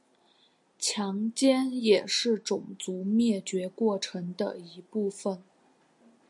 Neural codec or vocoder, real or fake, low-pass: none; real; 10.8 kHz